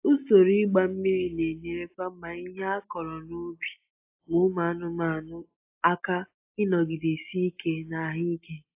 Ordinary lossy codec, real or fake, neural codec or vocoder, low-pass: AAC, 24 kbps; real; none; 3.6 kHz